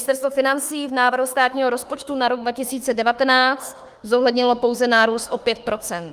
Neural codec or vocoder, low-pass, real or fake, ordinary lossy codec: autoencoder, 48 kHz, 32 numbers a frame, DAC-VAE, trained on Japanese speech; 14.4 kHz; fake; Opus, 32 kbps